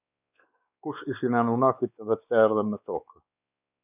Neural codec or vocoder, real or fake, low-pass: codec, 16 kHz, 4 kbps, X-Codec, WavLM features, trained on Multilingual LibriSpeech; fake; 3.6 kHz